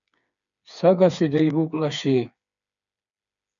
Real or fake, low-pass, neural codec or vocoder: fake; 7.2 kHz; codec, 16 kHz, 4 kbps, FreqCodec, smaller model